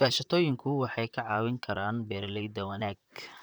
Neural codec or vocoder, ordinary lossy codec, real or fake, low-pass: vocoder, 44.1 kHz, 128 mel bands, Pupu-Vocoder; none; fake; none